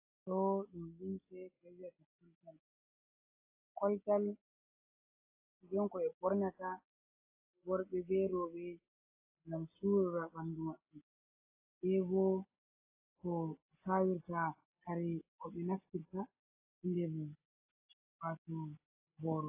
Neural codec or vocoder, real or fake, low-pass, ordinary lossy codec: none; real; 3.6 kHz; AAC, 24 kbps